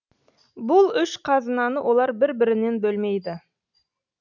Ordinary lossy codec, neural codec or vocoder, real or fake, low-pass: none; none; real; 7.2 kHz